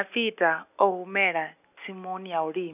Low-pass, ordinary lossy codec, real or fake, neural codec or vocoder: 3.6 kHz; none; real; none